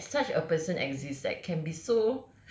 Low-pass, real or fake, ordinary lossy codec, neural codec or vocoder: none; real; none; none